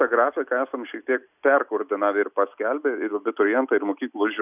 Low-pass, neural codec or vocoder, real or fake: 3.6 kHz; none; real